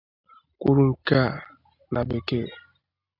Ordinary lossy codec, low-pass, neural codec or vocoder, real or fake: AAC, 48 kbps; 5.4 kHz; none; real